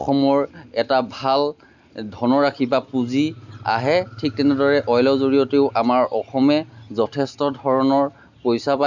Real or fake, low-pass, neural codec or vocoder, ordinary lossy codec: real; 7.2 kHz; none; none